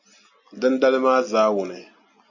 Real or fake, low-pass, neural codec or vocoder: real; 7.2 kHz; none